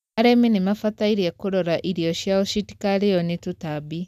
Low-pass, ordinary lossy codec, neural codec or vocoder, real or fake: 14.4 kHz; none; none; real